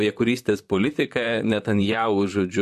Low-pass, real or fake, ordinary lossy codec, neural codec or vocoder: 14.4 kHz; fake; MP3, 64 kbps; vocoder, 44.1 kHz, 128 mel bands, Pupu-Vocoder